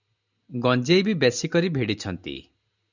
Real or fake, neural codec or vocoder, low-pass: real; none; 7.2 kHz